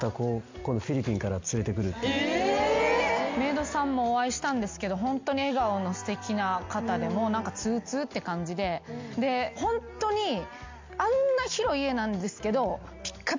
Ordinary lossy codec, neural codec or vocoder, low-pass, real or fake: MP3, 64 kbps; none; 7.2 kHz; real